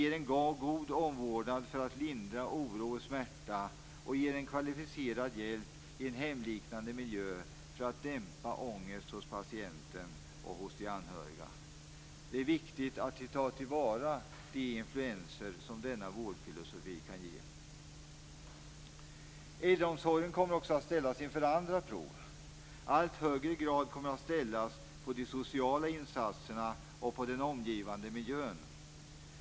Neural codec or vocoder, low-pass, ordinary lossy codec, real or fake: none; none; none; real